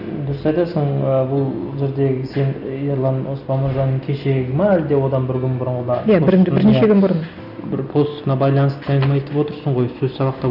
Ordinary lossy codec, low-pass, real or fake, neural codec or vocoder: none; 5.4 kHz; real; none